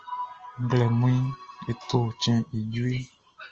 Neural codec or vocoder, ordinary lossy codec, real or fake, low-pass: none; Opus, 24 kbps; real; 7.2 kHz